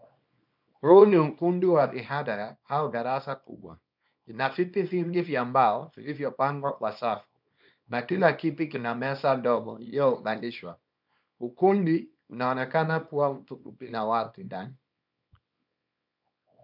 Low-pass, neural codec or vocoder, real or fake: 5.4 kHz; codec, 24 kHz, 0.9 kbps, WavTokenizer, small release; fake